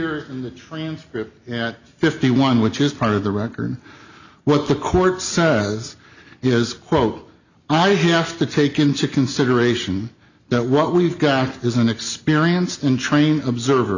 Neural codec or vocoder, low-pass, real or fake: none; 7.2 kHz; real